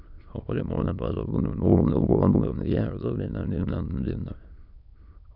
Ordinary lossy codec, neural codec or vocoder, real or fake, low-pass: none; autoencoder, 22.05 kHz, a latent of 192 numbers a frame, VITS, trained on many speakers; fake; 5.4 kHz